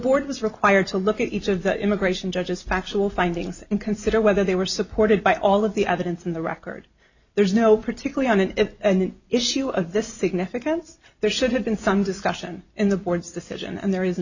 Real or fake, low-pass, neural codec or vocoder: real; 7.2 kHz; none